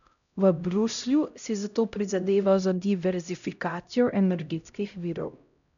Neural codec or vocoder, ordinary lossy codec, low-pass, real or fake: codec, 16 kHz, 0.5 kbps, X-Codec, HuBERT features, trained on LibriSpeech; none; 7.2 kHz; fake